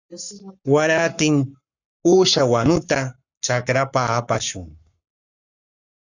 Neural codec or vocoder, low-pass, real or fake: codec, 44.1 kHz, 7.8 kbps, Pupu-Codec; 7.2 kHz; fake